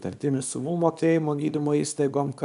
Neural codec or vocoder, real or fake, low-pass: codec, 24 kHz, 0.9 kbps, WavTokenizer, small release; fake; 10.8 kHz